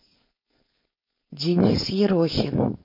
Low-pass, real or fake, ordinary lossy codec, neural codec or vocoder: 5.4 kHz; fake; MP3, 32 kbps; codec, 16 kHz, 4.8 kbps, FACodec